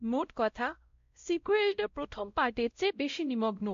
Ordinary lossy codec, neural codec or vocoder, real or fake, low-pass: MP3, 48 kbps; codec, 16 kHz, 0.5 kbps, X-Codec, WavLM features, trained on Multilingual LibriSpeech; fake; 7.2 kHz